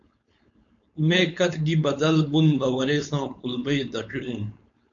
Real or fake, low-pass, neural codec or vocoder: fake; 7.2 kHz; codec, 16 kHz, 4.8 kbps, FACodec